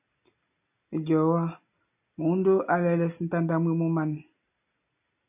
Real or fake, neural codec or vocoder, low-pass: real; none; 3.6 kHz